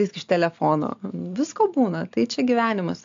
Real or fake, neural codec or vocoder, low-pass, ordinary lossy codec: real; none; 7.2 kHz; AAC, 64 kbps